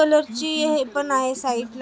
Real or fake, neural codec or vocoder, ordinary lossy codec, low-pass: real; none; none; none